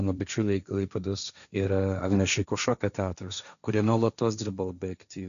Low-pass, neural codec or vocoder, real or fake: 7.2 kHz; codec, 16 kHz, 1.1 kbps, Voila-Tokenizer; fake